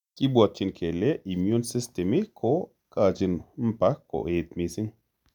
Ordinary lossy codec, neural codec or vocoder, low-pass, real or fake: none; none; 19.8 kHz; real